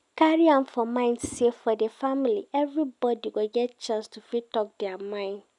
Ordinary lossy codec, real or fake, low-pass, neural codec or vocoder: none; real; 10.8 kHz; none